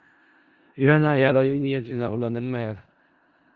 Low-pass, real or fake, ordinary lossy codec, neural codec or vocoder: 7.2 kHz; fake; Opus, 32 kbps; codec, 16 kHz in and 24 kHz out, 0.4 kbps, LongCat-Audio-Codec, four codebook decoder